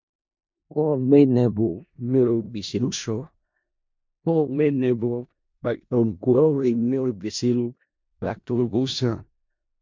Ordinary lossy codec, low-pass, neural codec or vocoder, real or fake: MP3, 48 kbps; 7.2 kHz; codec, 16 kHz in and 24 kHz out, 0.4 kbps, LongCat-Audio-Codec, four codebook decoder; fake